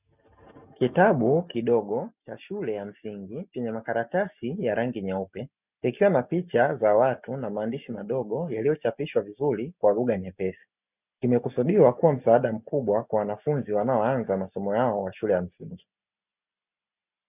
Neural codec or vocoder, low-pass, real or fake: none; 3.6 kHz; real